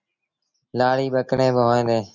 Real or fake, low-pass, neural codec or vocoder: real; 7.2 kHz; none